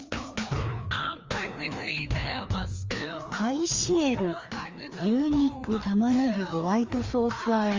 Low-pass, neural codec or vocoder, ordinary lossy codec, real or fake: 7.2 kHz; codec, 16 kHz, 2 kbps, FreqCodec, larger model; Opus, 32 kbps; fake